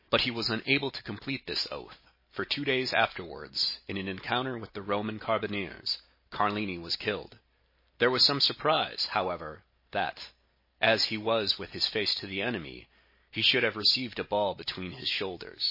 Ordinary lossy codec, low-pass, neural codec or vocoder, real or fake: MP3, 24 kbps; 5.4 kHz; none; real